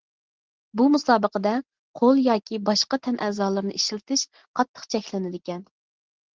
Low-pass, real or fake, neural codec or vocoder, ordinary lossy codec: 7.2 kHz; real; none; Opus, 16 kbps